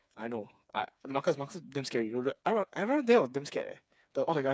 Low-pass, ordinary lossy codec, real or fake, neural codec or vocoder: none; none; fake; codec, 16 kHz, 4 kbps, FreqCodec, smaller model